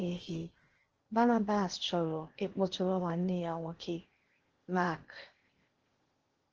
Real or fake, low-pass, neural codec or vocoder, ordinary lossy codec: fake; 7.2 kHz; codec, 16 kHz in and 24 kHz out, 0.8 kbps, FocalCodec, streaming, 65536 codes; Opus, 16 kbps